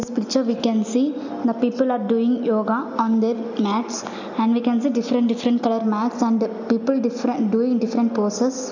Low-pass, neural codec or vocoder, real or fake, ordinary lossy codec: 7.2 kHz; none; real; none